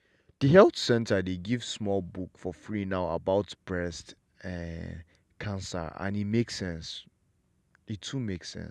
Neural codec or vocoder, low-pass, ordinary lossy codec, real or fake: none; none; none; real